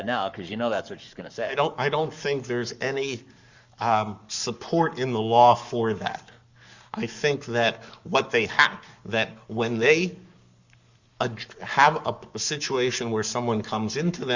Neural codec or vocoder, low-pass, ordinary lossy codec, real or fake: codec, 44.1 kHz, 7.8 kbps, Pupu-Codec; 7.2 kHz; Opus, 64 kbps; fake